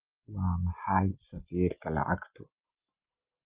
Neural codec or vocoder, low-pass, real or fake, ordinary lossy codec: none; 3.6 kHz; real; Opus, 32 kbps